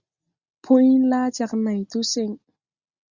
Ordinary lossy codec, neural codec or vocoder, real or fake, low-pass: Opus, 64 kbps; none; real; 7.2 kHz